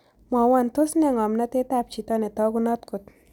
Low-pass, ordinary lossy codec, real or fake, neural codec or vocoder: 19.8 kHz; none; real; none